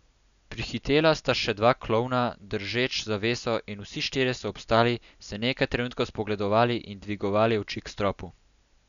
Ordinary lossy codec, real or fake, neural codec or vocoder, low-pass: none; real; none; 7.2 kHz